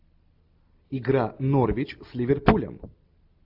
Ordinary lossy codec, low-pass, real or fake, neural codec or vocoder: AAC, 48 kbps; 5.4 kHz; real; none